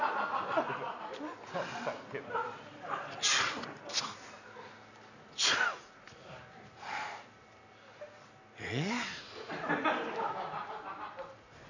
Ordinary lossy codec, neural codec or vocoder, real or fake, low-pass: none; none; real; 7.2 kHz